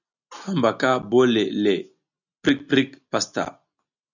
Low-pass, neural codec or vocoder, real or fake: 7.2 kHz; none; real